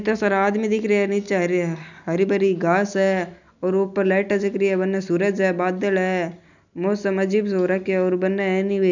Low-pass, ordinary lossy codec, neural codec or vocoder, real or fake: 7.2 kHz; none; none; real